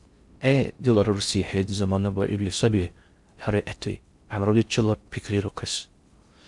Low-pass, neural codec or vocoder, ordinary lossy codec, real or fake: 10.8 kHz; codec, 16 kHz in and 24 kHz out, 0.6 kbps, FocalCodec, streaming, 4096 codes; Opus, 64 kbps; fake